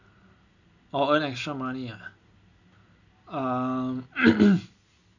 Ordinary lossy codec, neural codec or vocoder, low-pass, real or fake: none; none; 7.2 kHz; real